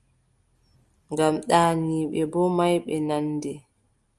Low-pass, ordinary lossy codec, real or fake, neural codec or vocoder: 10.8 kHz; Opus, 32 kbps; real; none